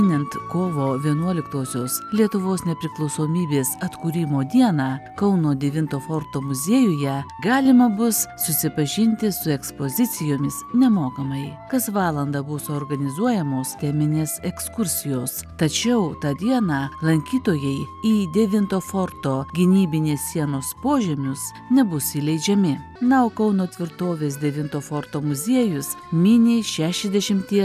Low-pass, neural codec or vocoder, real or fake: 14.4 kHz; none; real